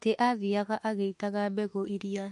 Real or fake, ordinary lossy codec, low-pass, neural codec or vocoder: fake; MP3, 48 kbps; 14.4 kHz; autoencoder, 48 kHz, 32 numbers a frame, DAC-VAE, trained on Japanese speech